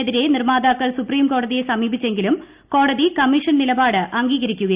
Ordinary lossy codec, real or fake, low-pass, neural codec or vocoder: Opus, 64 kbps; real; 3.6 kHz; none